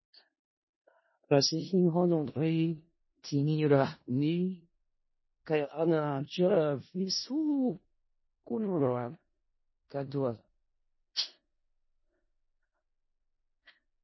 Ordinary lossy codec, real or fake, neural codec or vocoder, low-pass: MP3, 24 kbps; fake; codec, 16 kHz in and 24 kHz out, 0.4 kbps, LongCat-Audio-Codec, four codebook decoder; 7.2 kHz